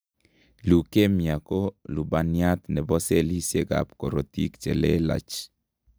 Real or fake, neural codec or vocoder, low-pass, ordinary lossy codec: real; none; none; none